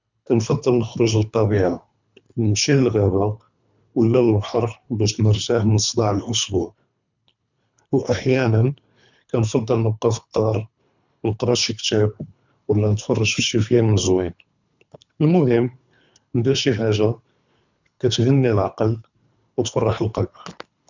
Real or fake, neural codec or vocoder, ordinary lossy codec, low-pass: fake; codec, 24 kHz, 3 kbps, HILCodec; none; 7.2 kHz